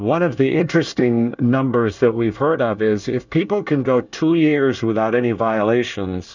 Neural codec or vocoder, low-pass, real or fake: codec, 24 kHz, 1 kbps, SNAC; 7.2 kHz; fake